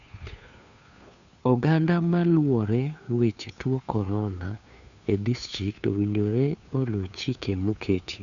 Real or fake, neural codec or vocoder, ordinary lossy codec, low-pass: fake; codec, 16 kHz, 2 kbps, FunCodec, trained on Chinese and English, 25 frames a second; none; 7.2 kHz